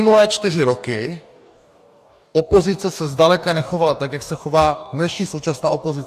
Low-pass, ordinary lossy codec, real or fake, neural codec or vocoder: 14.4 kHz; AAC, 96 kbps; fake; codec, 44.1 kHz, 2.6 kbps, DAC